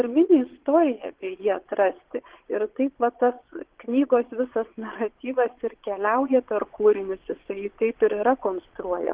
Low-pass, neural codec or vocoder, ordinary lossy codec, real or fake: 3.6 kHz; vocoder, 22.05 kHz, 80 mel bands, Vocos; Opus, 16 kbps; fake